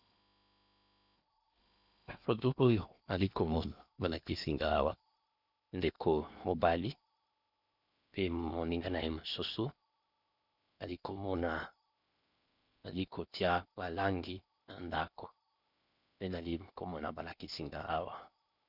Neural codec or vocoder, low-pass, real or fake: codec, 16 kHz in and 24 kHz out, 0.8 kbps, FocalCodec, streaming, 65536 codes; 5.4 kHz; fake